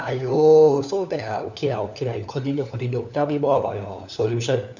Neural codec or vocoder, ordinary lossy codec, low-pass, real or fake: codec, 16 kHz, 4 kbps, FunCodec, trained on LibriTTS, 50 frames a second; none; 7.2 kHz; fake